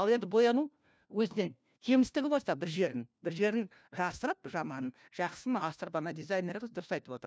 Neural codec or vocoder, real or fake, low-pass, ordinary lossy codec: codec, 16 kHz, 1 kbps, FunCodec, trained on LibriTTS, 50 frames a second; fake; none; none